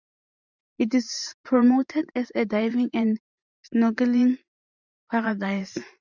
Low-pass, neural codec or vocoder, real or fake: 7.2 kHz; vocoder, 44.1 kHz, 128 mel bands every 512 samples, BigVGAN v2; fake